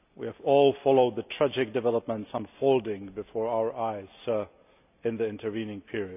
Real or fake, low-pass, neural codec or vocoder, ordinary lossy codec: real; 3.6 kHz; none; none